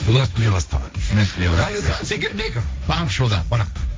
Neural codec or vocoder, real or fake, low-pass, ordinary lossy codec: codec, 16 kHz, 1.1 kbps, Voila-Tokenizer; fake; none; none